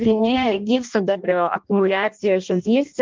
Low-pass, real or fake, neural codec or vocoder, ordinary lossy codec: 7.2 kHz; fake; codec, 16 kHz in and 24 kHz out, 0.6 kbps, FireRedTTS-2 codec; Opus, 24 kbps